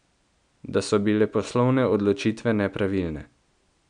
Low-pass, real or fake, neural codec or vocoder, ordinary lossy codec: 9.9 kHz; real; none; none